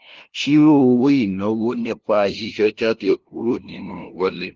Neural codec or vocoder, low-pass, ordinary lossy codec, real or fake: codec, 16 kHz, 0.5 kbps, FunCodec, trained on LibriTTS, 25 frames a second; 7.2 kHz; Opus, 16 kbps; fake